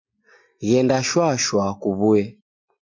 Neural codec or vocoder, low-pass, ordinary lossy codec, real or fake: none; 7.2 kHz; MP3, 48 kbps; real